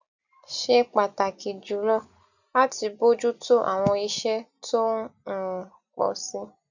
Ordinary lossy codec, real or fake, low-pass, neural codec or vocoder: none; real; 7.2 kHz; none